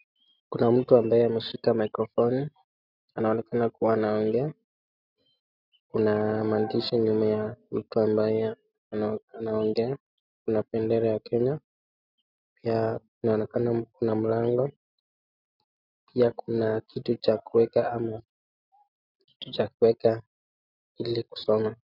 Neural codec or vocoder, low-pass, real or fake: none; 5.4 kHz; real